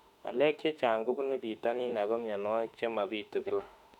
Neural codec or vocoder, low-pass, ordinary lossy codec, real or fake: autoencoder, 48 kHz, 32 numbers a frame, DAC-VAE, trained on Japanese speech; 19.8 kHz; none; fake